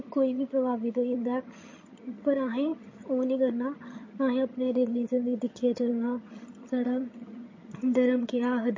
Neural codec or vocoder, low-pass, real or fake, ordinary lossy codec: vocoder, 22.05 kHz, 80 mel bands, HiFi-GAN; 7.2 kHz; fake; MP3, 32 kbps